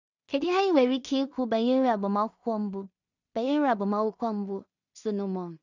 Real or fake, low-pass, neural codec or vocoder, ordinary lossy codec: fake; 7.2 kHz; codec, 16 kHz in and 24 kHz out, 0.4 kbps, LongCat-Audio-Codec, two codebook decoder; none